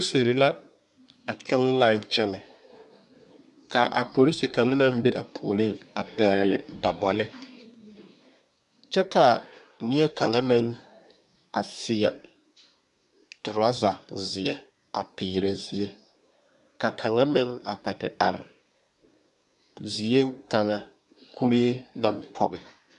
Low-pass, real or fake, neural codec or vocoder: 10.8 kHz; fake; codec, 24 kHz, 1 kbps, SNAC